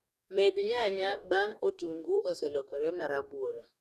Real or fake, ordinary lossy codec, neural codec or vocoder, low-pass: fake; none; codec, 44.1 kHz, 2.6 kbps, DAC; 14.4 kHz